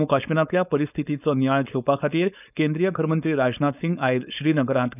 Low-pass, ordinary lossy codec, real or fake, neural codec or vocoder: 3.6 kHz; none; fake; codec, 16 kHz, 4.8 kbps, FACodec